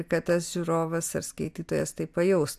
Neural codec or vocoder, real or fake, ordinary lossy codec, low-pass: none; real; AAC, 96 kbps; 14.4 kHz